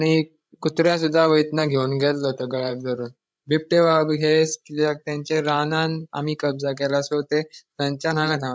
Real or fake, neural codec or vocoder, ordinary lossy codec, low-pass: fake; codec, 16 kHz, 16 kbps, FreqCodec, larger model; none; none